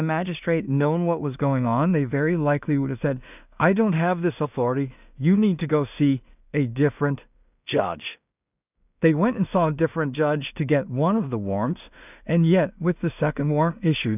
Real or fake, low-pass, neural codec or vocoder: fake; 3.6 kHz; codec, 16 kHz in and 24 kHz out, 0.4 kbps, LongCat-Audio-Codec, two codebook decoder